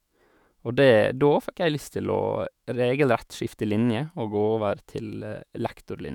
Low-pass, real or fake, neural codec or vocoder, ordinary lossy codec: 19.8 kHz; fake; autoencoder, 48 kHz, 128 numbers a frame, DAC-VAE, trained on Japanese speech; none